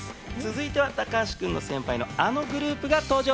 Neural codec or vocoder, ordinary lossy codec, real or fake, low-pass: none; none; real; none